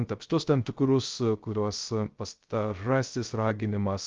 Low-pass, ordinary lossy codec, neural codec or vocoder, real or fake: 7.2 kHz; Opus, 32 kbps; codec, 16 kHz, 0.3 kbps, FocalCodec; fake